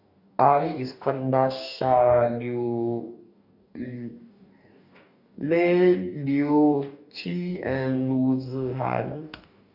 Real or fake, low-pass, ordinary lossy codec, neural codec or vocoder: fake; 5.4 kHz; none; codec, 44.1 kHz, 2.6 kbps, DAC